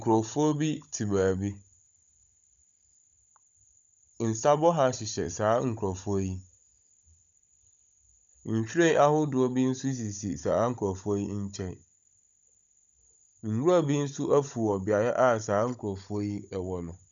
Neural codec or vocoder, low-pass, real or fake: codec, 16 kHz, 16 kbps, FunCodec, trained on Chinese and English, 50 frames a second; 7.2 kHz; fake